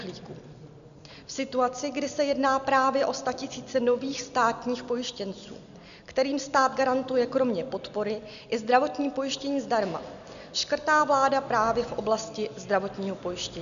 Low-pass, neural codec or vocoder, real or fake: 7.2 kHz; none; real